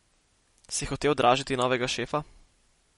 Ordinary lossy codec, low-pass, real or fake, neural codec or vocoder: MP3, 48 kbps; 19.8 kHz; real; none